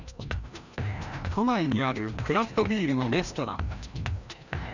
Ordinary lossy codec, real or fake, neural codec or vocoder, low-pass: none; fake; codec, 16 kHz, 1 kbps, FreqCodec, larger model; 7.2 kHz